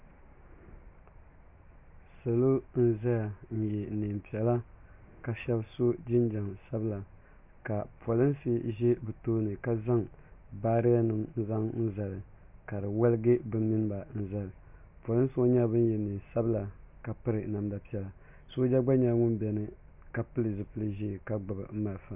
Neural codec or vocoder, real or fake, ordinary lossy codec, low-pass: none; real; MP3, 32 kbps; 3.6 kHz